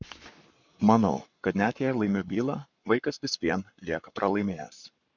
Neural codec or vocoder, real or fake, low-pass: codec, 44.1 kHz, 7.8 kbps, Pupu-Codec; fake; 7.2 kHz